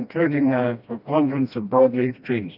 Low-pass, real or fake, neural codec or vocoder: 5.4 kHz; fake; codec, 16 kHz, 1 kbps, FreqCodec, smaller model